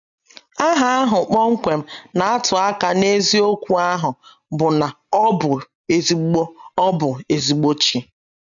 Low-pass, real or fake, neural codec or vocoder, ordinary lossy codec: 7.2 kHz; real; none; none